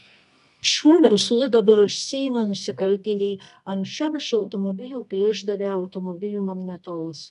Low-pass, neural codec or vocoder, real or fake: 10.8 kHz; codec, 24 kHz, 0.9 kbps, WavTokenizer, medium music audio release; fake